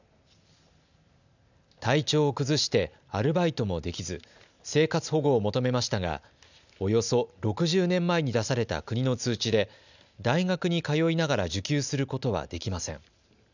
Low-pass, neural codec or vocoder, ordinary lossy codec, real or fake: 7.2 kHz; none; none; real